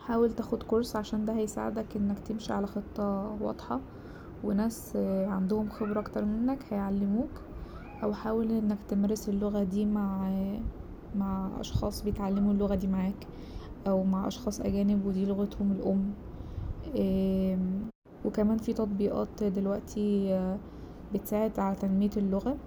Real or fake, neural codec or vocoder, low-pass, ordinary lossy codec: real; none; none; none